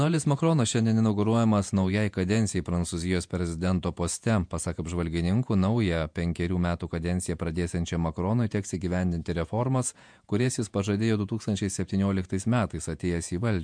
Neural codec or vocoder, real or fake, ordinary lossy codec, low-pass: vocoder, 48 kHz, 128 mel bands, Vocos; fake; MP3, 64 kbps; 9.9 kHz